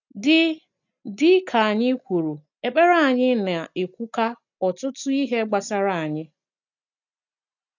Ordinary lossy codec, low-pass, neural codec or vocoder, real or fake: none; 7.2 kHz; none; real